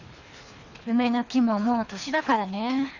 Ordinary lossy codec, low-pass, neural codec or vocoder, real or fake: none; 7.2 kHz; codec, 24 kHz, 3 kbps, HILCodec; fake